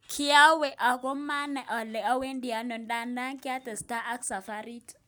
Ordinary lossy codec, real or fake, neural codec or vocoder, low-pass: none; real; none; none